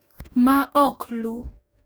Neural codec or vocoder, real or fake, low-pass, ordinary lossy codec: codec, 44.1 kHz, 2.6 kbps, DAC; fake; none; none